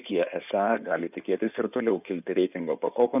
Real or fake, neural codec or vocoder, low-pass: fake; codec, 16 kHz in and 24 kHz out, 2.2 kbps, FireRedTTS-2 codec; 3.6 kHz